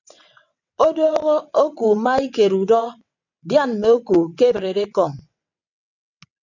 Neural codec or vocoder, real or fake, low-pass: vocoder, 22.05 kHz, 80 mel bands, WaveNeXt; fake; 7.2 kHz